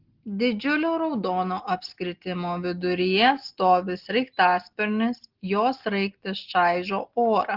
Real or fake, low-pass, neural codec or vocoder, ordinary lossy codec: real; 5.4 kHz; none; Opus, 16 kbps